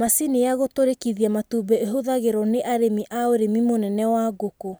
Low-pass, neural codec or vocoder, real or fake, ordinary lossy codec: none; none; real; none